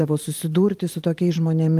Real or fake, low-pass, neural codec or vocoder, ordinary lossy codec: fake; 14.4 kHz; vocoder, 44.1 kHz, 128 mel bands every 512 samples, BigVGAN v2; Opus, 32 kbps